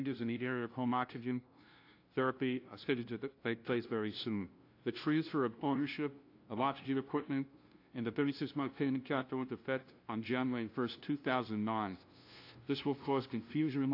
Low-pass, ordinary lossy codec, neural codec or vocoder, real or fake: 5.4 kHz; AAC, 32 kbps; codec, 16 kHz, 0.5 kbps, FunCodec, trained on LibriTTS, 25 frames a second; fake